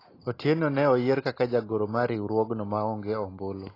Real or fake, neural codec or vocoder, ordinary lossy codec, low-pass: real; none; AAC, 24 kbps; 5.4 kHz